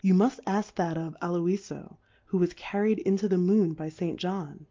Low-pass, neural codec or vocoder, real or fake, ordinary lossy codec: 7.2 kHz; none; real; Opus, 32 kbps